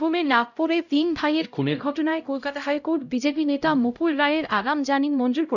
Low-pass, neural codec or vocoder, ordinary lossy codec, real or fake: 7.2 kHz; codec, 16 kHz, 0.5 kbps, X-Codec, HuBERT features, trained on LibriSpeech; none; fake